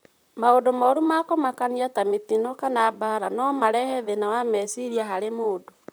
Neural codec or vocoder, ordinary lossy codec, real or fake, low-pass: vocoder, 44.1 kHz, 128 mel bands, Pupu-Vocoder; none; fake; none